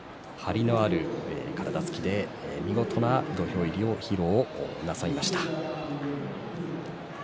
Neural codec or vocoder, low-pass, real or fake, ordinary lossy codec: none; none; real; none